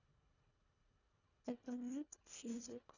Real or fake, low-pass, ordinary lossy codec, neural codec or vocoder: fake; 7.2 kHz; none; codec, 24 kHz, 1.5 kbps, HILCodec